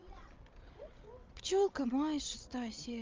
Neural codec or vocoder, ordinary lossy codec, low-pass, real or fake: codec, 16 kHz, 8 kbps, FreqCodec, larger model; Opus, 24 kbps; 7.2 kHz; fake